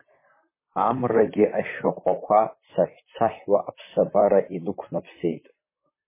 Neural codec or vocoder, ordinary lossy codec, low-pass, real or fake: vocoder, 44.1 kHz, 128 mel bands, Pupu-Vocoder; MP3, 16 kbps; 3.6 kHz; fake